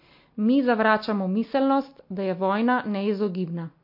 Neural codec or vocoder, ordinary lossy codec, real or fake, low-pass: none; MP3, 32 kbps; real; 5.4 kHz